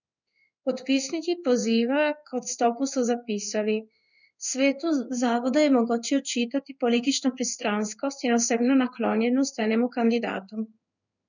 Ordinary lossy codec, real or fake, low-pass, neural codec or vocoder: none; fake; 7.2 kHz; codec, 16 kHz in and 24 kHz out, 1 kbps, XY-Tokenizer